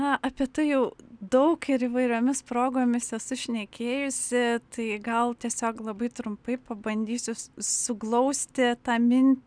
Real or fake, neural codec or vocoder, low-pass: real; none; 9.9 kHz